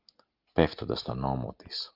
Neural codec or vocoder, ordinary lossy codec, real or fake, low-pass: none; Opus, 32 kbps; real; 5.4 kHz